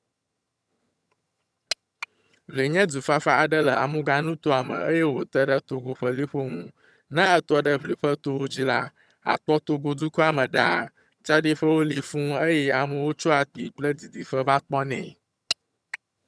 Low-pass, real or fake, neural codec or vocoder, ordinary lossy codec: none; fake; vocoder, 22.05 kHz, 80 mel bands, HiFi-GAN; none